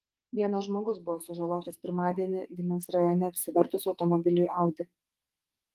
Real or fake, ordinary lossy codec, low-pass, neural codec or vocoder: fake; Opus, 24 kbps; 14.4 kHz; codec, 44.1 kHz, 2.6 kbps, SNAC